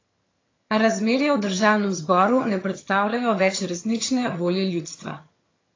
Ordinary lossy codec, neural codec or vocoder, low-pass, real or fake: AAC, 32 kbps; vocoder, 22.05 kHz, 80 mel bands, HiFi-GAN; 7.2 kHz; fake